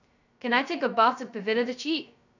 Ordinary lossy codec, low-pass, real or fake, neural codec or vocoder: none; 7.2 kHz; fake; codec, 16 kHz, 0.2 kbps, FocalCodec